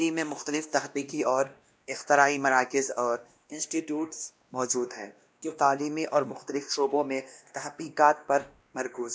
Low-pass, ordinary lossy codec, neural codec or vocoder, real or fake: none; none; codec, 16 kHz, 1 kbps, X-Codec, WavLM features, trained on Multilingual LibriSpeech; fake